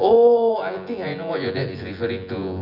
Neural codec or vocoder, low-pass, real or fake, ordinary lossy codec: vocoder, 24 kHz, 100 mel bands, Vocos; 5.4 kHz; fake; none